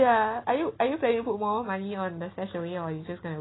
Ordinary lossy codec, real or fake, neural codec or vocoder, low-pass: AAC, 16 kbps; real; none; 7.2 kHz